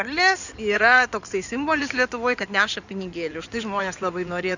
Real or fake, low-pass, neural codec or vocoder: fake; 7.2 kHz; codec, 16 kHz in and 24 kHz out, 2.2 kbps, FireRedTTS-2 codec